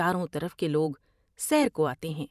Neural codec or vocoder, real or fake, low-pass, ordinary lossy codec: vocoder, 44.1 kHz, 128 mel bands every 512 samples, BigVGAN v2; fake; 14.4 kHz; none